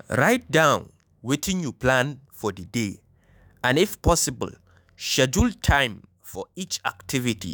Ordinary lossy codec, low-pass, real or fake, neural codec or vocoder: none; none; fake; autoencoder, 48 kHz, 128 numbers a frame, DAC-VAE, trained on Japanese speech